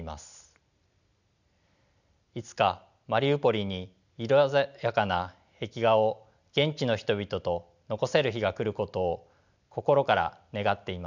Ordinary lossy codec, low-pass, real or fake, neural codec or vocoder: none; 7.2 kHz; real; none